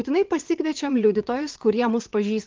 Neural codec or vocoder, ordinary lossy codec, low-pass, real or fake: vocoder, 22.05 kHz, 80 mel bands, WaveNeXt; Opus, 32 kbps; 7.2 kHz; fake